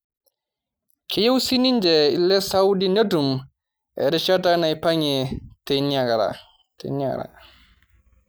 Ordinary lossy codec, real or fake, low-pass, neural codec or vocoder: none; real; none; none